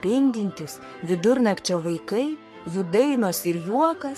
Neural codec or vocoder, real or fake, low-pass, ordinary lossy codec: codec, 44.1 kHz, 3.4 kbps, Pupu-Codec; fake; 14.4 kHz; MP3, 64 kbps